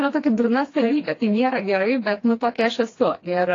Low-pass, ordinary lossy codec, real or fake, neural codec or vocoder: 7.2 kHz; AAC, 32 kbps; fake; codec, 16 kHz, 2 kbps, FreqCodec, smaller model